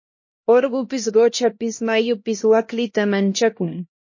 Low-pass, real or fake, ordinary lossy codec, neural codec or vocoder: 7.2 kHz; fake; MP3, 32 kbps; codec, 16 kHz, 1 kbps, X-Codec, HuBERT features, trained on LibriSpeech